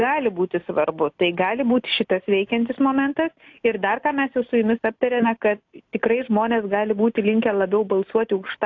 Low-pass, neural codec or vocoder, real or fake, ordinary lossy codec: 7.2 kHz; none; real; AAC, 48 kbps